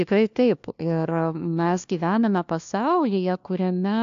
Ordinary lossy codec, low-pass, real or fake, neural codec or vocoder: AAC, 64 kbps; 7.2 kHz; fake; codec, 16 kHz, 1 kbps, FunCodec, trained on LibriTTS, 50 frames a second